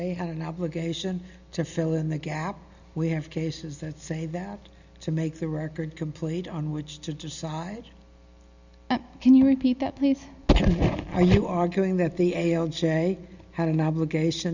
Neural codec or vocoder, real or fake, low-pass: none; real; 7.2 kHz